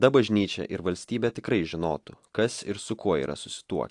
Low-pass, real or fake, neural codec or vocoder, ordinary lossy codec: 10.8 kHz; real; none; AAC, 64 kbps